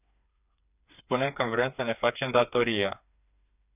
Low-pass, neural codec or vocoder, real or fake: 3.6 kHz; codec, 16 kHz, 4 kbps, FreqCodec, smaller model; fake